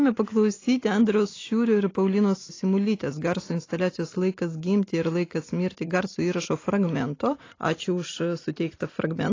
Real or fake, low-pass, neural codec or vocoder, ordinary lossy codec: real; 7.2 kHz; none; AAC, 32 kbps